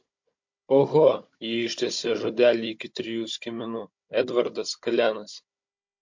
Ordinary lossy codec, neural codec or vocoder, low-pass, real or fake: MP3, 48 kbps; codec, 16 kHz, 16 kbps, FunCodec, trained on Chinese and English, 50 frames a second; 7.2 kHz; fake